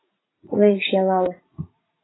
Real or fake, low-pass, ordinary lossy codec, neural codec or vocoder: fake; 7.2 kHz; AAC, 16 kbps; vocoder, 44.1 kHz, 80 mel bands, Vocos